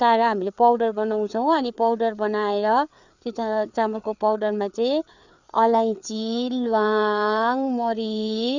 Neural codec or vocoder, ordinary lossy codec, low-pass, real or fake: codec, 16 kHz, 4 kbps, FreqCodec, larger model; none; 7.2 kHz; fake